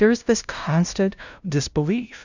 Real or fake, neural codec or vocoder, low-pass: fake; codec, 16 kHz, 0.5 kbps, FunCodec, trained on LibriTTS, 25 frames a second; 7.2 kHz